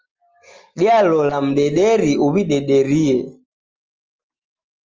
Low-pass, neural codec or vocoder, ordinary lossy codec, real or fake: 7.2 kHz; none; Opus, 24 kbps; real